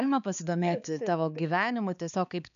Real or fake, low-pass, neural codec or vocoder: fake; 7.2 kHz; codec, 16 kHz, 4 kbps, X-Codec, HuBERT features, trained on balanced general audio